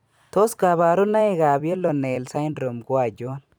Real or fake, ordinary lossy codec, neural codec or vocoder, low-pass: fake; none; vocoder, 44.1 kHz, 128 mel bands every 256 samples, BigVGAN v2; none